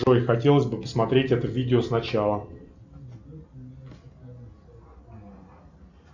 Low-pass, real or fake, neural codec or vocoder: 7.2 kHz; real; none